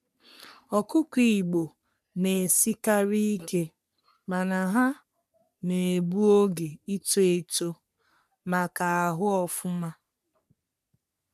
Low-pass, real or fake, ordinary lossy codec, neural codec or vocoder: 14.4 kHz; fake; none; codec, 44.1 kHz, 3.4 kbps, Pupu-Codec